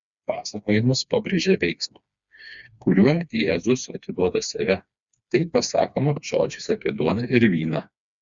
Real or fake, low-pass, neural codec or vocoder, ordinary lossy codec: fake; 7.2 kHz; codec, 16 kHz, 2 kbps, FreqCodec, smaller model; Opus, 64 kbps